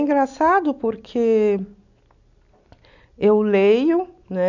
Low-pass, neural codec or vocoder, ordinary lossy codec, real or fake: 7.2 kHz; none; none; real